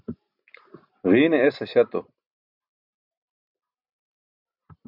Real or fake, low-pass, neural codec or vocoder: real; 5.4 kHz; none